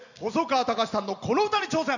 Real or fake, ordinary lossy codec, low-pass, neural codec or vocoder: real; none; 7.2 kHz; none